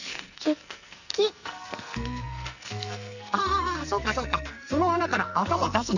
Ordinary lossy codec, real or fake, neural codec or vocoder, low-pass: none; fake; codec, 44.1 kHz, 2.6 kbps, SNAC; 7.2 kHz